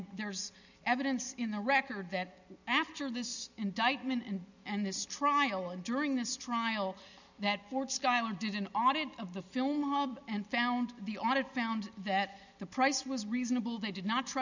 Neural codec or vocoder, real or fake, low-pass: none; real; 7.2 kHz